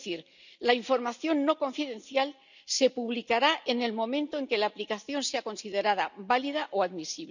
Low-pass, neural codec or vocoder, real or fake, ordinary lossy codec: 7.2 kHz; none; real; none